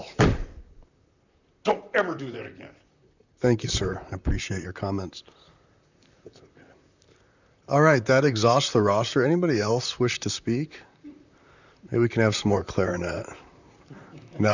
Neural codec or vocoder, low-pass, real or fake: vocoder, 44.1 kHz, 128 mel bands, Pupu-Vocoder; 7.2 kHz; fake